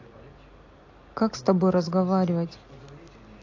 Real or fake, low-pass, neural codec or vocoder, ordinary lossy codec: real; 7.2 kHz; none; AAC, 48 kbps